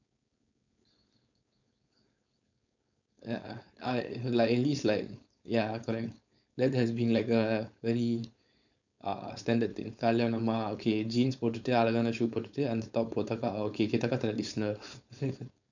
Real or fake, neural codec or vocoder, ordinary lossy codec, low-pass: fake; codec, 16 kHz, 4.8 kbps, FACodec; none; 7.2 kHz